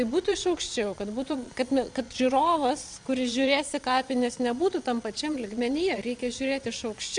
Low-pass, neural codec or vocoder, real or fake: 9.9 kHz; vocoder, 22.05 kHz, 80 mel bands, Vocos; fake